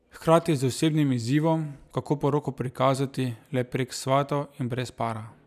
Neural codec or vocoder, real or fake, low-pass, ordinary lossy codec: none; real; 14.4 kHz; none